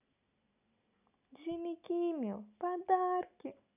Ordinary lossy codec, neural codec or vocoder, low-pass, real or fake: none; none; 3.6 kHz; real